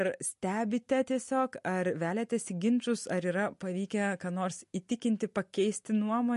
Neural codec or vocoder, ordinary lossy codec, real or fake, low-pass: none; MP3, 48 kbps; real; 14.4 kHz